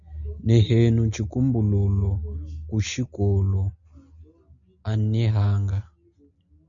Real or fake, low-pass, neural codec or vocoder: real; 7.2 kHz; none